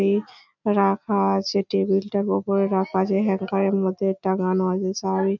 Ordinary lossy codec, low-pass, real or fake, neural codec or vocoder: none; 7.2 kHz; real; none